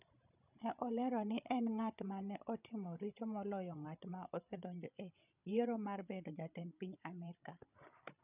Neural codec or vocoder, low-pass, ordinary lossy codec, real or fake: codec, 16 kHz, 16 kbps, FreqCodec, larger model; 3.6 kHz; none; fake